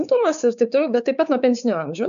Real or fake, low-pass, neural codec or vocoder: fake; 7.2 kHz; codec, 16 kHz, 4 kbps, X-Codec, WavLM features, trained on Multilingual LibriSpeech